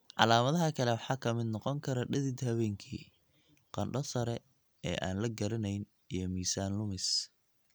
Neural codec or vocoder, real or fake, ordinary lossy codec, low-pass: none; real; none; none